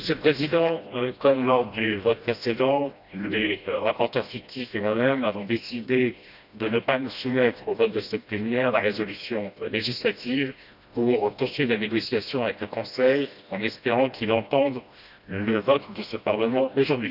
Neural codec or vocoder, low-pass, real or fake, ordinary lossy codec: codec, 16 kHz, 1 kbps, FreqCodec, smaller model; 5.4 kHz; fake; none